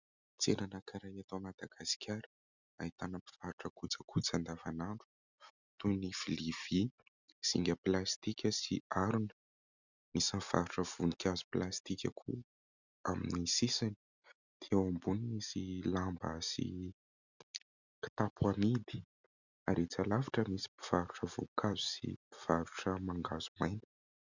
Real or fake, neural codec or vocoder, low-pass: real; none; 7.2 kHz